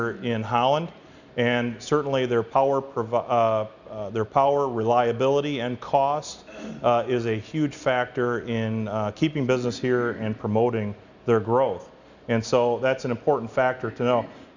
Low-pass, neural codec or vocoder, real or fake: 7.2 kHz; none; real